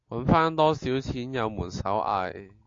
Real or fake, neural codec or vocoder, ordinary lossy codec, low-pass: real; none; AAC, 48 kbps; 7.2 kHz